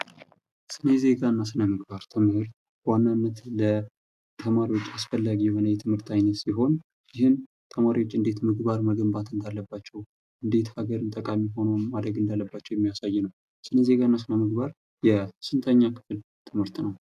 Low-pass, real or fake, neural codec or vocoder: 14.4 kHz; real; none